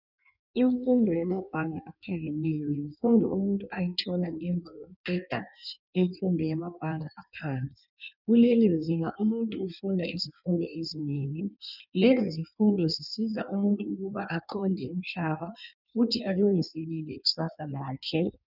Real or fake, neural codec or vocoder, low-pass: fake; codec, 16 kHz in and 24 kHz out, 1.1 kbps, FireRedTTS-2 codec; 5.4 kHz